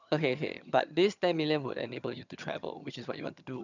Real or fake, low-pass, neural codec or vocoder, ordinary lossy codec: fake; 7.2 kHz; vocoder, 22.05 kHz, 80 mel bands, HiFi-GAN; none